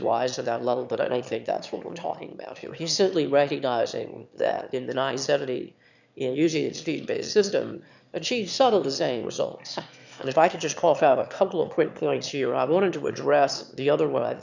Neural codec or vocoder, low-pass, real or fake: autoencoder, 22.05 kHz, a latent of 192 numbers a frame, VITS, trained on one speaker; 7.2 kHz; fake